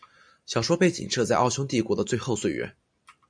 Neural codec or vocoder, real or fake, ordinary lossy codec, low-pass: none; real; MP3, 96 kbps; 9.9 kHz